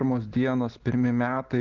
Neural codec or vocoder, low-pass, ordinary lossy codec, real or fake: none; 7.2 kHz; Opus, 16 kbps; real